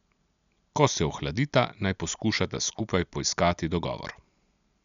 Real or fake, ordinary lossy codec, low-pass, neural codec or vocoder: real; none; 7.2 kHz; none